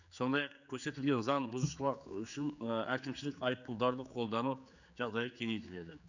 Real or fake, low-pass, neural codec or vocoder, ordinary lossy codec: fake; 7.2 kHz; codec, 16 kHz, 4 kbps, X-Codec, HuBERT features, trained on general audio; none